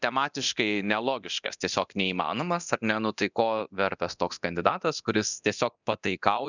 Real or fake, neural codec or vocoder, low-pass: fake; codec, 24 kHz, 0.9 kbps, DualCodec; 7.2 kHz